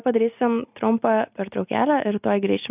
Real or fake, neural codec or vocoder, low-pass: real; none; 3.6 kHz